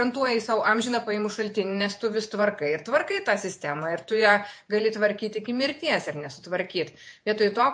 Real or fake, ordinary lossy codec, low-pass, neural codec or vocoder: fake; MP3, 48 kbps; 9.9 kHz; vocoder, 48 kHz, 128 mel bands, Vocos